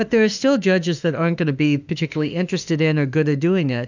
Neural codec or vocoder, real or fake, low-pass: autoencoder, 48 kHz, 32 numbers a frame, DAC-VAE, trained on Japanese speech; fake; 7.2 kHz